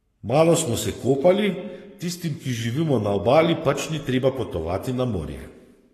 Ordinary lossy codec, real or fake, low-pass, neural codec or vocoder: AAC, 48 kbps; fake; 14.4 kHz; codec, 44.1 kHz, 7.8 kbps, Pupu-Codec